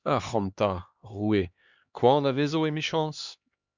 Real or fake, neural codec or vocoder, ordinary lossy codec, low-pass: fake; codec, 16 kHz, 2 kbps, X-Codec, HuBERT features, trained on LibriSpeech; Opus, 64 kbps; 7.2 kHz